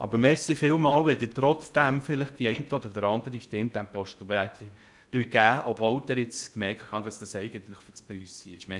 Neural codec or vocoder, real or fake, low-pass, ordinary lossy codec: codec, 16 kHz in and 24 kHz out, 0.6 kbps, FocalCodec, streaming, 2048 codes; fake; 10.8 kHz; none